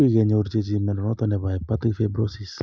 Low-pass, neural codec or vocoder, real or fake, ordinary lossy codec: none; none; real; none